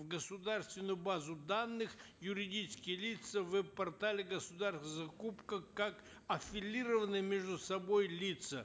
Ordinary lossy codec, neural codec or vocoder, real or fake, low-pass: none; none; real; none